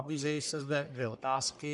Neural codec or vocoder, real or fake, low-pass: codec, 44.1 kHz, 1.7 kbps, Pupu-Codec; fake; 10.8 kHz